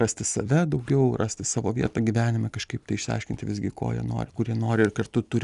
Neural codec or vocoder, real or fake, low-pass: none; real; 10.8 kHz